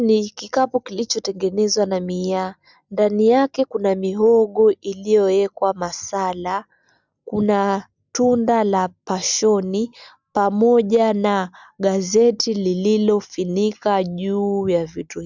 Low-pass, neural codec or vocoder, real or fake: 7.2 kHz; none; real